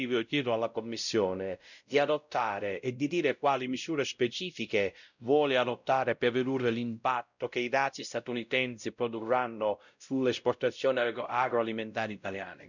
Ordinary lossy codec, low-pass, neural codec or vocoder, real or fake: none; 7.2 kHz; codec, 16 kHz, 0.5 kbps, X-Codec, WavLM features, trained on Multilingual LibriSpeech; fake